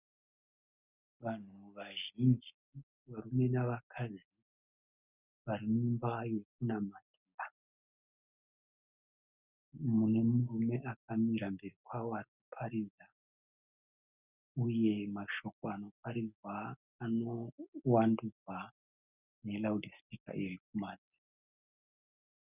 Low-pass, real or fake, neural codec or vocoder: 3.6 kHz; real; none